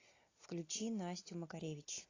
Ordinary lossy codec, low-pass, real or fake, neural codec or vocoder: AAC, 32 kbps; 7.2 kHz; real; none